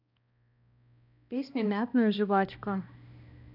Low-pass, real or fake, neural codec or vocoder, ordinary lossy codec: 5.4 kHz; fake; codec, 16 kHz, 1 kbps, X-Codec, HuBERT features, trained on balanced general audio; none